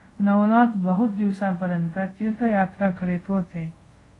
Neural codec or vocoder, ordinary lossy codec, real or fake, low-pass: codec, 24 kHz, 0.5 kbps, DualCodec; AAC, 32 kbps; fake; 10.8 kHz